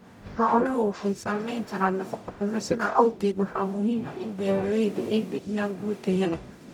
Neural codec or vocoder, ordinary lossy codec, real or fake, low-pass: codec, 44.1 kHz, 0.9 kbps, DAC; none; fake; 19.8 kHz